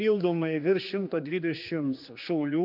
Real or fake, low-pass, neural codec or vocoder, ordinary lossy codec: fake; 5.4 kHz; codec, 16 kHz, 2 kbps, X-Codec, HuBERT features, trained on general audio; MP3, 48 kbps